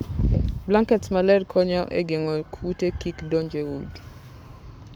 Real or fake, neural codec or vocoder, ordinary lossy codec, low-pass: fake; codec, 44.1 kHz, 7.8 kbps, Pupu-Codec; none; none